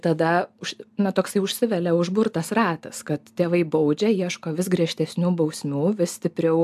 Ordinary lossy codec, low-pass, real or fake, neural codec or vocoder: AAC, 96 kbps; 14.4 kHz; real; none